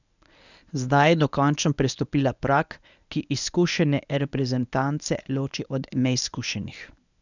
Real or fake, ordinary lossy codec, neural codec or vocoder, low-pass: fake; none; codec, 24 kHz, 0.9 kbps, WavTokenizer, medium speech release version 1; 7.2 kHz